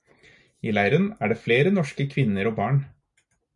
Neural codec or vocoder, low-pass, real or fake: none; 10.8 kHz; real